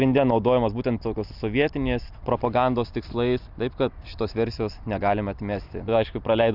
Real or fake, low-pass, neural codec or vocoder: real; 5.4 kHz; none